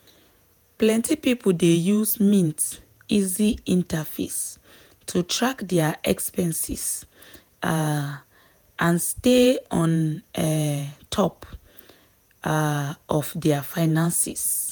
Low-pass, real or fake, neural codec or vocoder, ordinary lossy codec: none; fake; vocoder, 48 kHz, 128 mel bands, Vocos; none